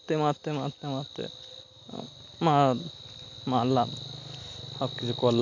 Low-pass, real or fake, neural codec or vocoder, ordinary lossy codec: 7.2 kHz; real; none; MP3, 48 kbps